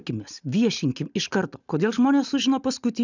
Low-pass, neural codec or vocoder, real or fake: 7.2 kHz; none; real